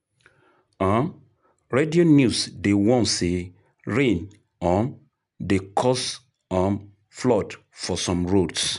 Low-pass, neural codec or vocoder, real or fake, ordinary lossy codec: 10.8 kHz; none; real; none